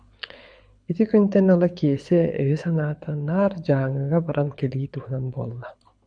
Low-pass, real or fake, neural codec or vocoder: 9.9 kHz; fake; codec, 24 kHz, 6 kbps, HILCodec